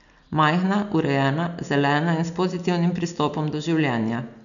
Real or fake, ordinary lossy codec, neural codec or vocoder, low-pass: real; none; none; 7.2 kHz